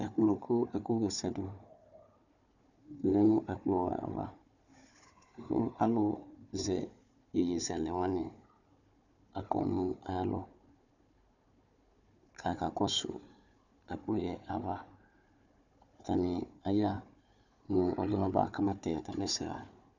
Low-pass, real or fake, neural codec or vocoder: 7.2 kHz; fake; codec, 16 kHz, 4 kbps, FunCodec, trained on Chinese and English, 50 frames a second